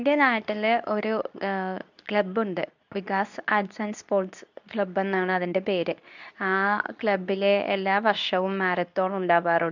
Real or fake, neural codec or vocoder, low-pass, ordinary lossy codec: fake; codec, 24 kHz, 0.9 kbps, WavTokenizer, medium speech release version 2; 7.2 kHz; none